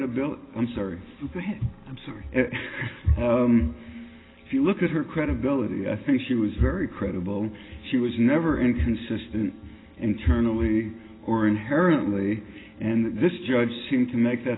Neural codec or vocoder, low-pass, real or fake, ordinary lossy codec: none; 7.2 kHz; real; AAC, 16 kbps